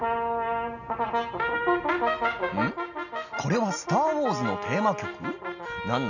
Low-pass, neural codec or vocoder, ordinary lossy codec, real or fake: 7.2 kHz; none; none; real